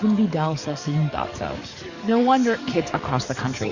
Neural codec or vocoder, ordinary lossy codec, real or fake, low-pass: codec, 44.1 kHz, 7.8 kbps, DAC; Opus, 64 kbps; fake; 7.2 kHz